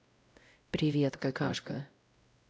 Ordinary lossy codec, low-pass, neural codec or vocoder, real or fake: none; none; codec, 16 kHz, 0.5 kbps, X-Codec, WavLM features, trained on Multilingual LibriSpeech; fake